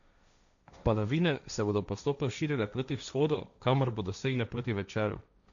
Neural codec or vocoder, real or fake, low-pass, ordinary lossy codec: codec, 16 kHz, 1.1 kbps, Voila-Tokenizer; fake; 7.2 kHz; none